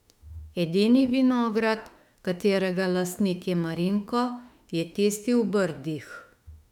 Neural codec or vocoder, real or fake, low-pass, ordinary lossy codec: autoencoder, 48 kHz, 32 numbers a frame, DAC-VAE, trained on Japanese speech; fake; 19.8 kHz; none